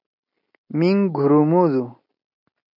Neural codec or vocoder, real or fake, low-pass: none; real; 5.4 kHz